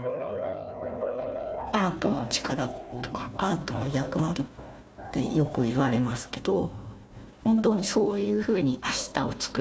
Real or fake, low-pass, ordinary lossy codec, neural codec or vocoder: fake; none; none; codec, 16 kHz, 1 kbps, FunCodec, trained on Chinese and English, 50 frames a second